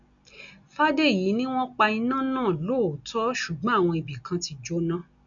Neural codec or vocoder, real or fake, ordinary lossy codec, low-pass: none; real; none; 7.2 kHz